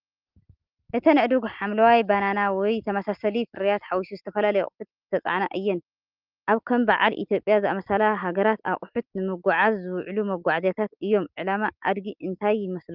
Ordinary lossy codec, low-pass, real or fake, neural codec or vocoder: Opus, 24 kbps; 5.4 kHz; real; none